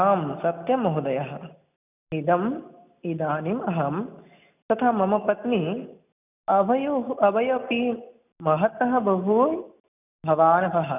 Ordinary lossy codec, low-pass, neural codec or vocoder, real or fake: none; 3.6 kHz; none; real